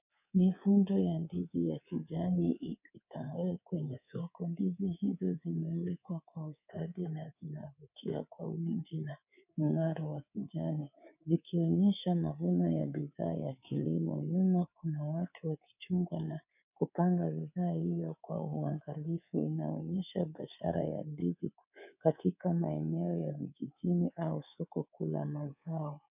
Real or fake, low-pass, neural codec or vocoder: fake; 3.6 kHz; codec, 24 kHz, 3.1 kbps, DualCodec